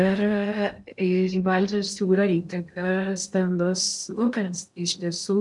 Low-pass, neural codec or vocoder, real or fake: 10.8 kHz; codec, 16 kHz in and 24 kHz out, 0.6 kbps, FocalCodec, streaming, 4096 codes; fake